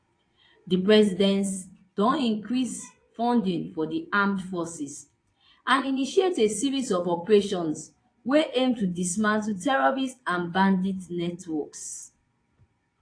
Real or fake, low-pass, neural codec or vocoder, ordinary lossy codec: fake; 9.9 kHz; vocoder, 22.05 kHz, 80 mel bands, Vocos; AAC, 48 kbps